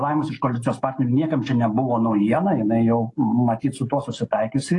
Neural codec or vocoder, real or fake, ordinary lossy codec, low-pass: none; real; AAC, 48 kbps; 10.8 kHz